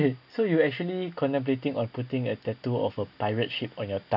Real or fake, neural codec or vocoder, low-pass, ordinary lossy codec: real; none; 5.4 kHz; none